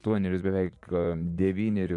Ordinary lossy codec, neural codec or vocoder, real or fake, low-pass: AAC, 64 kbps; vocoder, 24 kHz, 100 mel bands, Vocos; fake; 10.8 kHz